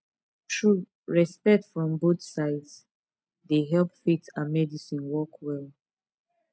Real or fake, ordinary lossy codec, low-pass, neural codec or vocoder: real; none; none; none